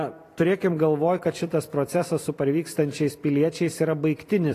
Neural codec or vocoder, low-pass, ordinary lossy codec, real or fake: vocoder, 44.1 kHz, 128 mel bands every 256 samples, BigVGAN v2; 14.4 kHz; AAC, 48 kbps; fake